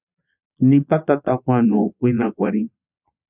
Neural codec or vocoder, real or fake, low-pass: vocoder, 22.05 kHz, 80 mel bands, Vocos; fake; 3.6 kHz